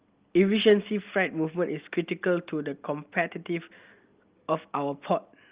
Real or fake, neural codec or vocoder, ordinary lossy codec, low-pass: real; none; Opus, 32 kbps; 3.6 kHz